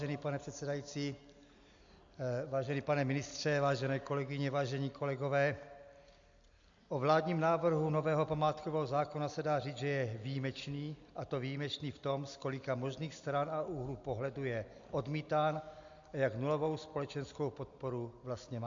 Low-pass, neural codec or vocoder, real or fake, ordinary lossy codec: 7.2 kHz; none; real; MP3, 64 kbps